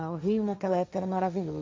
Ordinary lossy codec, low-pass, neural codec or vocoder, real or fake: none; none; codec, 16 kHz, 1.1 kbps, Voila-Tokenizer; fake